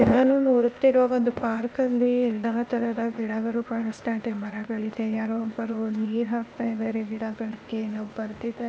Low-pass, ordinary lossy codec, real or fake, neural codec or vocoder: none; none; fake; codec, 16 kHz, 0.8 kbps, ZipCodec